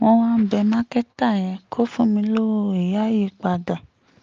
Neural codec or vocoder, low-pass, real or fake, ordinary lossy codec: none; 7.2 kHz; real; Opus, 24 kbps